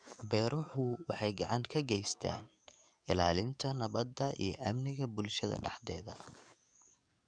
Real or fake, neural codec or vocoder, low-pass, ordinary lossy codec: fake; codec, 44.1 kHz, 7.8 kbps, DAC; 9.9 kHz; none